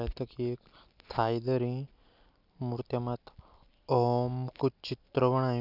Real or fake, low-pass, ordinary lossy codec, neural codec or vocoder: real; 5.4 kHz; none; none